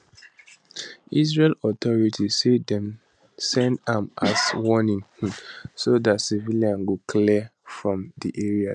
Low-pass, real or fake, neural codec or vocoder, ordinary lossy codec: 9.9 kHz; real; none; MP3, 96 kbps